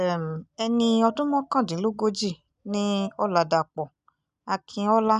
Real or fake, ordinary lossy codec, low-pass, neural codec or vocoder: real; none; 10.8 kHz; none